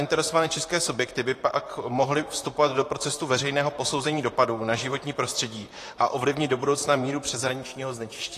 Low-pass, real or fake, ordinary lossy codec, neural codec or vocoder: 14.4 kHz; fake; AAC, 48 kbps; vocoder, 44.1 kHz, 128 mel bands, Pupu-Vocoder